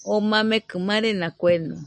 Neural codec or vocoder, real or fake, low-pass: none; real; 9.9 kHz